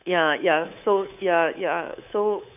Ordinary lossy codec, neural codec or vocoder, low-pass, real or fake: none; codec, 24 kHz, 3.1 kbps, DualCodec; 3.6 kHz; fake